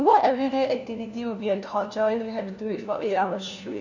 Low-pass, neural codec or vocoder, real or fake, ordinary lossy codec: 7.2 kHz; codec, 16 kHz, 1 kbps, FunCodec, trained on LibriTTS, 50 frames a second; fake; none